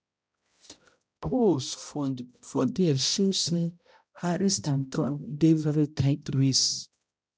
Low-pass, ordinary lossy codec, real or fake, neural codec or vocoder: none; none; fake; codec, 16 kHz, 0.5 kbps, X-Codec, HuBERT features, trained on balanced general audio